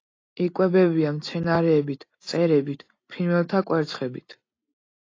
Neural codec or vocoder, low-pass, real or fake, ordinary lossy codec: none; 7.2 kHz; real; AAC, 32 kbps